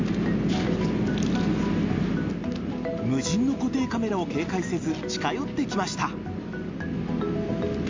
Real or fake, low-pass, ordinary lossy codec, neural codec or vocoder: real; 7.2 kHz; MP3, 64 kbps; none